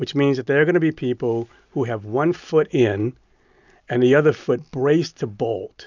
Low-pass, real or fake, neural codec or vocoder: 7.2 kHz; real; none